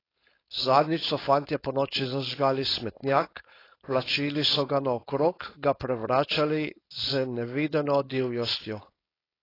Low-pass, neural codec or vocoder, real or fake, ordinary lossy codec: 5.4 kHz; codec, 16 kHz, 4.8 kbps, FACodec; fake; AAC, 24 kbps